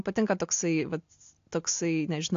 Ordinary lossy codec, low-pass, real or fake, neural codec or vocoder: AAC, 48 kbps; 7.2 kHz; real; none